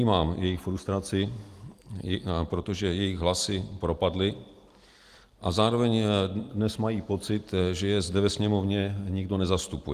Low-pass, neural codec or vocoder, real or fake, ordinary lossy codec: 14.4 kHz; none; real; Opus, 24 kbps